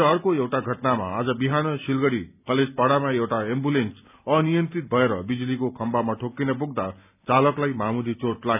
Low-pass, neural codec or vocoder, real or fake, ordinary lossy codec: 3.6 kHz; none; real; none